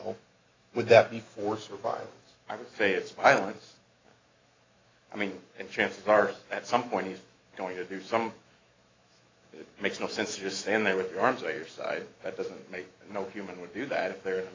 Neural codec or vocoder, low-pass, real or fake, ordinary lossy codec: autoencoder, 48 kHz, 128 numbers a frame, DAC-VAE, trained on Japanese speech; 7.2 kHz; fake; AAC, 32 kbps